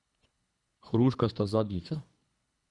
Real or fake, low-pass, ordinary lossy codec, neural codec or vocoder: fake; 10.8 kHz; Opus, 64 kbps; codec, 24 kHz, 3 kbps, HILCodec